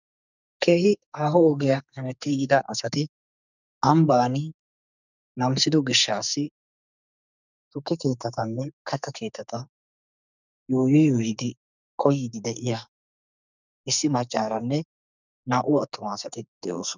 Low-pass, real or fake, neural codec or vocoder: 7.2 kHz; fake; codec, 44.1 kHz, 2.6 kbps, SNAC